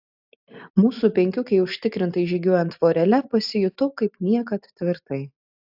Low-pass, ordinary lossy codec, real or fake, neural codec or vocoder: 5.4 kHz; AAC, 48 kbps; real; none